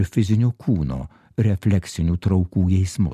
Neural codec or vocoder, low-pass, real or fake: none; 14.4 kHz; real